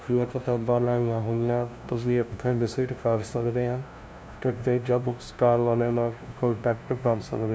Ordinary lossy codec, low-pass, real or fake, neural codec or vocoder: none; none; fake; codec, 16 kHz, 0.5 kbps, FunCodec, trained on LibriTTS, 25 frames a second